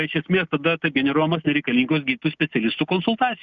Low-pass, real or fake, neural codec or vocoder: 7.2 kHz; real; none